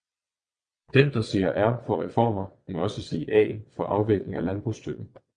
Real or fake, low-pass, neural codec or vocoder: fake; 9.9 kHz; vocoder, 22.05 kHz, 80 mel bands, WaveNeXt